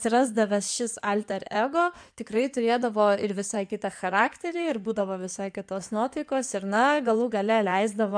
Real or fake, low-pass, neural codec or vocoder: fake; 9.9 kHz; codec, 16 kHz in and 24 kHz out, 2.2 kbps, FireRedTTS-2 codec